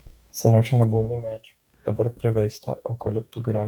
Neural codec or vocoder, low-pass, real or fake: codec, 44.1 kHz, 2.6 kbps, DAC; 19.8 kHz; fake